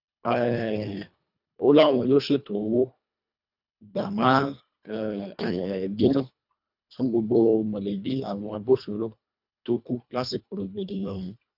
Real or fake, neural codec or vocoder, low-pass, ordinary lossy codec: fake; codec, 24 kHz, 1.5 kbps, HILCodec; 5.4 kHz; none